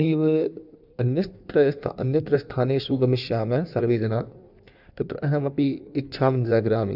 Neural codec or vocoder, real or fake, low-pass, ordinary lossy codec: codec, 16 kHz in and 24 kHz out, 1.1 kbps, FireRedTTS-2 codec; fake; 5.4 kHz; none